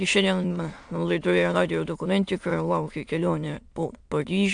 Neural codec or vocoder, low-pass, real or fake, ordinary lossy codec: autoencoder, 22.05 kHz, a latent of 192 numbers a frame, VITS, trained on many speakers; 9.9 kHz; fake; AAC, 64 kbps